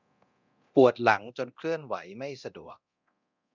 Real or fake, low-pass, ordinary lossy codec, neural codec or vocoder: fake; 7.2 kHz; none; codec, 24 kHz, 0.9 kbps, DualCodec